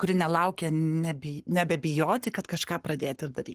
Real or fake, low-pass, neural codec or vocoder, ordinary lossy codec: fake; 14.4 kHz; codec, 44.1 kHz, 7.8 kbps, Pupu-Codec; Opus, 24 kbps